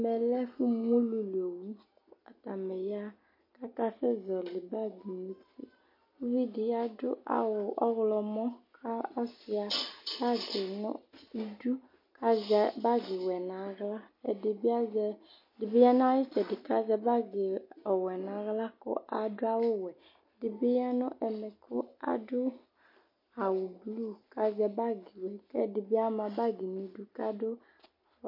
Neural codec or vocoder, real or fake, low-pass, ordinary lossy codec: none; real; 5.4 kHz; MP3, 32 kbps